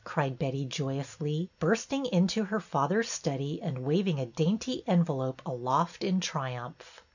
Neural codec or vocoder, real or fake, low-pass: none; real; 7.2 kHz